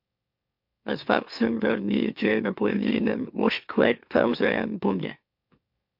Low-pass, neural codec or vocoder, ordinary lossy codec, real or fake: 5.4 kHz; autoencoder, 44.1 kHz, a latent of 192 numbers a frame, MeloTTS; MP3, 48 kbps; fake